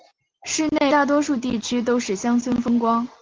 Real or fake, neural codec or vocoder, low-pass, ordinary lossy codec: real; none; 7.2 kHz; Opus, 16 kbps